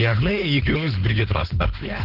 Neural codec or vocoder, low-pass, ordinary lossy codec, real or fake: codec, 16 kHz, 4.8 kbps, FACodec; 5.4 kHz; Opus, 16 kbps; fake